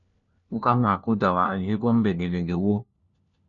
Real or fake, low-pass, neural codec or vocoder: fake; 7.2 kHz; codec, 16 kHz, 1 kbps, FunCodec, trained on LibriTTS, 50 frames a second